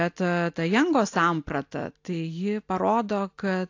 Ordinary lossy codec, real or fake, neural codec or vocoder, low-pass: AAC, 48 kbps; real; none; 7.2 kHz